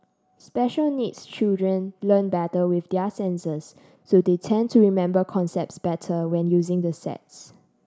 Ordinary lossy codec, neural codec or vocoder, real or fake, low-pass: none; none; real; none